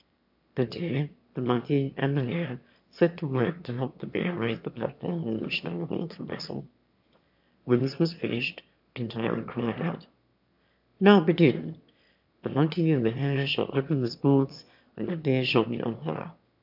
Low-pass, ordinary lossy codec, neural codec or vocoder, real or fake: 5.4 kHz; MP3, 48 kbps; autoencoder, 22.05 kHz, a latent of 192 numbers a frame, VITS, trained on one speaker; fake